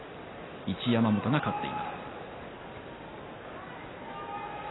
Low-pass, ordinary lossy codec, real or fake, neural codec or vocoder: 7.2 kHz; AAC, 16 kbps; real; none